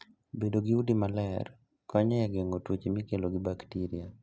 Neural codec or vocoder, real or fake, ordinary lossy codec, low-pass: none; real; none; none